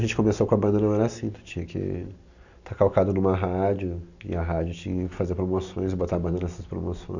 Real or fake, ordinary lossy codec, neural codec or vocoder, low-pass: real; none; none; 7.2 kHz